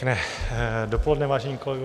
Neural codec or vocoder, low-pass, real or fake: none; 14.4 kHz; real